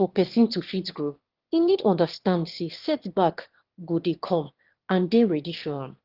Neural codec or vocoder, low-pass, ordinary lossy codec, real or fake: autoencoder, 22.05 kHz, a latent of 192 numbers a frame, VITS, trained on one speaker; 5.4 kHz; Opus, 16 kbps; fake